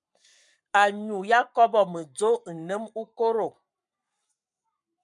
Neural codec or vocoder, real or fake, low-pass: codec, 44.1 kHz, 7.8 kbps, Pupu-Codec; fake; 10.8 kHz